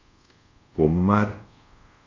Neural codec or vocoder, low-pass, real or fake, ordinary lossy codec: codec, 24 kHz, 0.5 kbps, DualCodec; 7.2 kHz; fake; MP3, 48 kbps